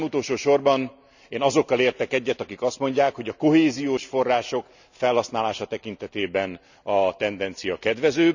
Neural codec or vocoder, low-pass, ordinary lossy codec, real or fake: none; 7.2 kHz; none; real